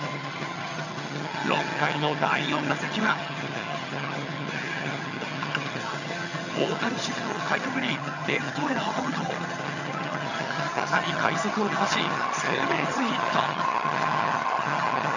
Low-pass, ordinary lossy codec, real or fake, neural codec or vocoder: 7.2 kHz; none; fake; vocoder, 22.05 kHz, 80 mel bands, HiFi-GAN